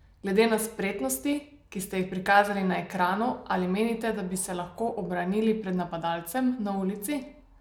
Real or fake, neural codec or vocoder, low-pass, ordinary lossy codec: real; none; none; none